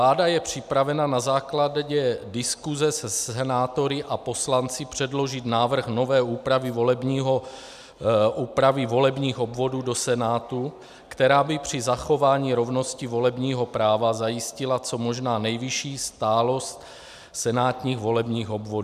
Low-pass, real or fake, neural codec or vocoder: 14.4 kHz; real; none